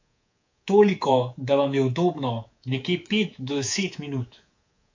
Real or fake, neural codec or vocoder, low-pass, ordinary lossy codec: fake; codec, 24 kHz, 3.1 kbps, DualCodec; 7.2 kHz; AAC, 48 kbps